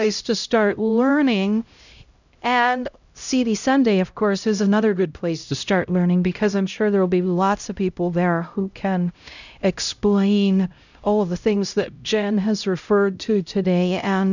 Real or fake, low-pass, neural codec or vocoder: fake; 7.2 kHz; codec, 16 kHz, 0.5 kbps, X-Codec, HuBERT features, trained on LibriSpeech